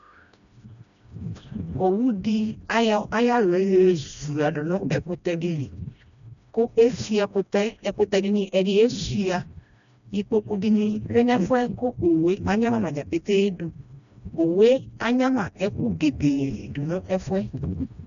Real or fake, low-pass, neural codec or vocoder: fake; 7.2 kHz; codec, 16 kHz, 1 kbps, FreqCodec, smaller model